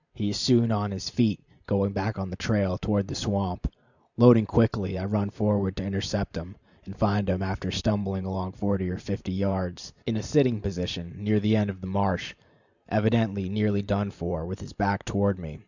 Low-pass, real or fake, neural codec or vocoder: 7.2 kHz; fake; vocoder, 44.1 kHz, 128 mel bands every 512 samples, BigVGAN v2